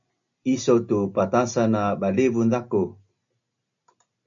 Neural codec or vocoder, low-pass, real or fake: none; 7.2 kHz; real